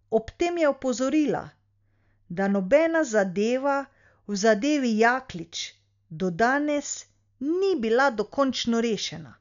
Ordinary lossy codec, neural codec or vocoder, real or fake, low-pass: none; none; real; 7.2 kHz